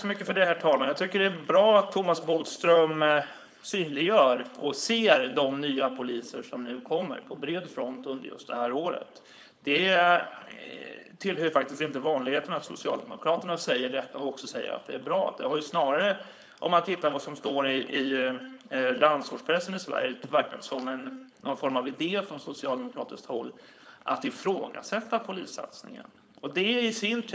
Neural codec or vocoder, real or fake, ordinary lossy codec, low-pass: codec, 16 kHz, 4.8 kbps, FACodec; fake; none; none